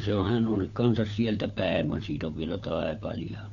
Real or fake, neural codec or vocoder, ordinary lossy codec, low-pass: fake; codec, 16 kHz, 4 kbps, FunCodec, trained on LibriTTS, 50 frames a second; none; 7.2 kHz